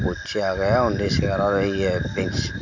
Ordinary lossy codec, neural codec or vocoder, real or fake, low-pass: MP3, 64 kbps; none; real; 7.2 kHz